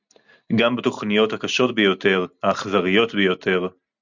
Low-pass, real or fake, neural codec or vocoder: 7.2 kHz; real; none